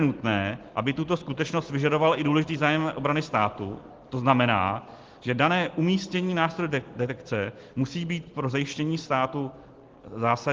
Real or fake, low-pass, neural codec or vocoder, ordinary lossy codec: real; 7.2 kHz; none; Opus, 16 kbps